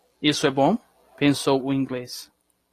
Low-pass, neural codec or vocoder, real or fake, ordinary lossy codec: 14.4 kHz; none; real; AAC, 48 kbps